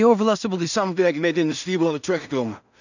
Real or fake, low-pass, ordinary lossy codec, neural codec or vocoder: fake; 7.2 kHz; none; codec, 16 kHz in and 24 kHz out, 0.4 kbps, LongCat-Audio-Codec, two codebook decoder